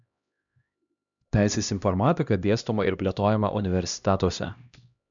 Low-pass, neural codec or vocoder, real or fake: 7.2 kHz; codec, 16 kHz, 1 kbps, X-Codec, HuBERT features, trained on LibriSpeech; fake